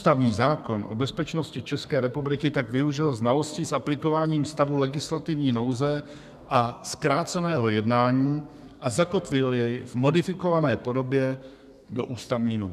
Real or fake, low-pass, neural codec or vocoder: fake; 14.4 kHz; codec, 32 kHz, 1.9 kbps, SNAC